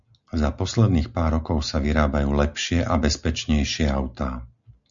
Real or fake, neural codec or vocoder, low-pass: real; none; 7.2 kHz